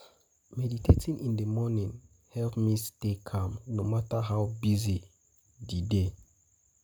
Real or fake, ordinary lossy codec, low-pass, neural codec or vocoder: real; none; none; none